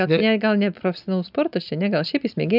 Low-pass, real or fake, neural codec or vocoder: 5.4 kHz; real; none